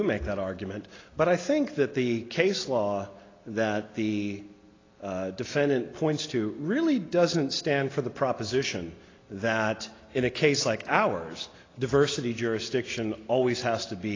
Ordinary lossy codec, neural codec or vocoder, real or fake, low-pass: AAC, 32 kbps; none; real; 7.2 kHz